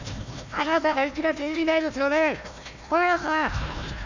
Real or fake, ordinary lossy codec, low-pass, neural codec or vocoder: fake; none; 7.2 kHz; codec, 16 kHz, 1 kbps, FunCodec, trained on Chinese and English, 50 frames a second